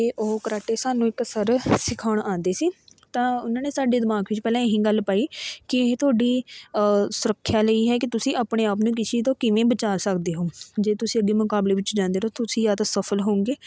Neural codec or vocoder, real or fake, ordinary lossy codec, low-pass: none; real; none; none